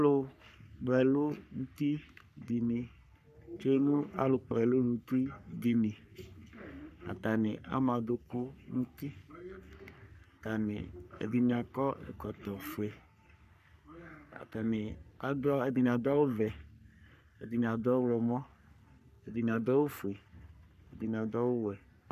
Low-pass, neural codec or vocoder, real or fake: 14.4 kHz; codec, 44.1 kHz, 3.4 kbps, Pupu-Codec; fake